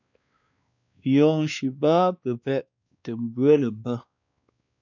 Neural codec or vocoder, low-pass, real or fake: codec, 16 kHz, 2 kbps, X-Codec, WavLM features, trained on Multilingual LibriSpeech; 7.2 kHz; fake